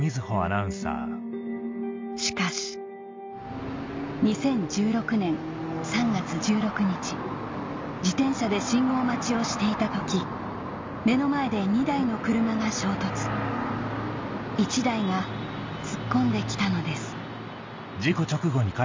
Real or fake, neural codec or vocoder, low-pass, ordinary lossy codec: real; none; 7.2 kHz; MP3, 64 kbps